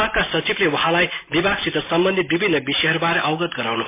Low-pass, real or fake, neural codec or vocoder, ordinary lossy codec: 3.6 kHz; real; none; MP3, 24 kbps